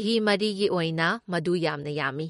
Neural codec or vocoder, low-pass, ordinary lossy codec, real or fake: none; 19.8 kHz; MP3, 48 kbps; real